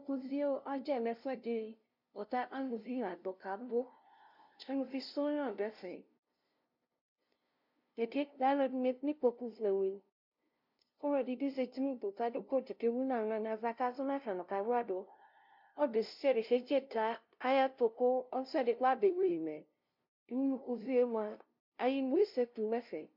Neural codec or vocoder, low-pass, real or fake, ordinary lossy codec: codec, 16 kHz, 0.5 kbps, FunCodec, trained on LibriTTS, 25 frames a second; 5.4 kHz; fake; MP3, 48 kbps